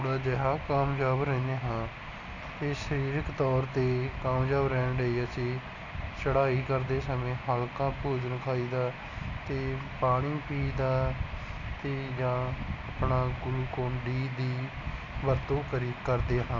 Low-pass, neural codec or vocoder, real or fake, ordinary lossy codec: 7.2 kHz; none; real; none